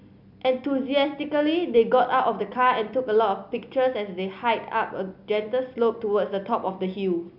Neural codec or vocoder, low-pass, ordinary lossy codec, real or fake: none; 5.4 kHz; none; real